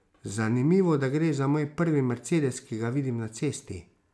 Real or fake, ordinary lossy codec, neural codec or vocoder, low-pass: real; none; none; none